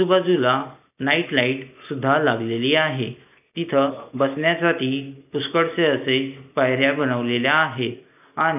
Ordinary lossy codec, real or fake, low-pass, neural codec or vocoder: none; real; 3.6 kHz; none